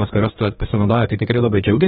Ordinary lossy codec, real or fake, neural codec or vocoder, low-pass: AAC, 16 kbps; fake; codec, 32 kHz, 1.9 kbps, SNAC; 14.4 kHz